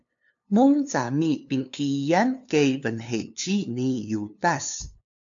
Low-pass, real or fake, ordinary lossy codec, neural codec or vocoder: 7.2 kHz; fake; AAC, 64 kbps; codec, 16 kHz, 2 kbps, FunCodec, trained on LibriTTS, 25 frames a second